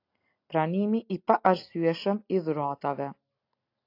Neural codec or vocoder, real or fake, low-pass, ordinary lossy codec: none; real; 5.4 kHz; AAC, 32 kbps